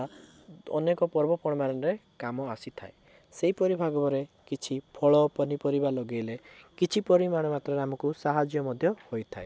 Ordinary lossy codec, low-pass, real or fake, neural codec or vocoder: none; none; real; none